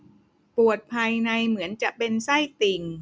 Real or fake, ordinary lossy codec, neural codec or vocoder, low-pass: real; none; none; none